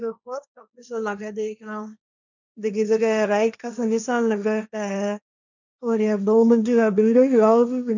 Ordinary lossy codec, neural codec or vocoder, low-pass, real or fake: none; codec, 16 kHz, 1.1 kbps, Voila-Tokenizer; 7.2 kHz; fake